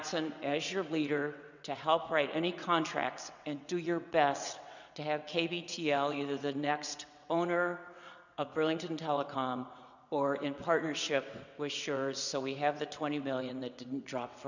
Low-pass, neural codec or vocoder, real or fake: 7.2 kHz; vocoder, 22.05 kHz, 80 mel bands, WaveNeXt; fake